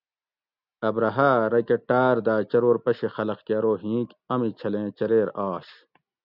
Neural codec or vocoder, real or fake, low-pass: none; real; 5.4 kHz